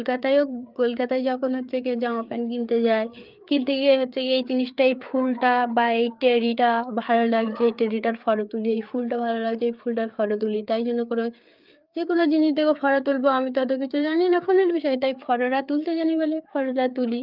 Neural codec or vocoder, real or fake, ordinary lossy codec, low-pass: codec, 16 kHz, 4 kbps, FreqCodec, larger model; fake; Opus, 24 kbps; 5.4 kHz